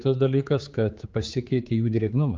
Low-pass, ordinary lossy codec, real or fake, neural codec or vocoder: 7.2 kHz; Opus, 24 kbps; fake; codec, 16 kHz, 4 kbps, X-Codec, HuBERT features, trained on general audio